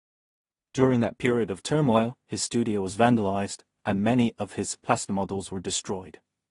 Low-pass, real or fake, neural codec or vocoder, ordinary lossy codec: 10.8 kHz; fake; codec, 16 kHz in and 24 kHz out, 0.4 kbps, LongCat-Audio-Codec, two codebook decoder; AAC, 32 kbps